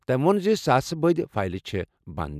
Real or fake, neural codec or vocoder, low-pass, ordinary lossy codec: real; none; 14.4 kHz; none